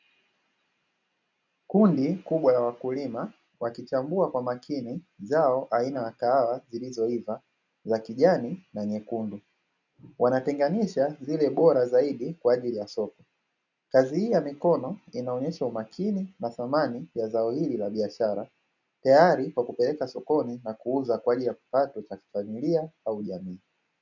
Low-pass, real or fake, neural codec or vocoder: 7.2 kHz; real; none